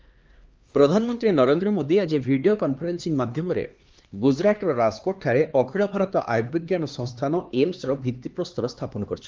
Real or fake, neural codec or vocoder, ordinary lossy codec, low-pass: fake; codec, 16 kHz, 2 kbps, X-Codec, HuBERT features, trained on LibriSpeech; Opus, 32 kbps; 7.2 kHz